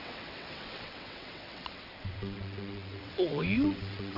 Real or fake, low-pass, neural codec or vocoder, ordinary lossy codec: fake; 5.4 kHz; vocoder, 22.05 kHz, 80 mel bands, WaveNeXt; none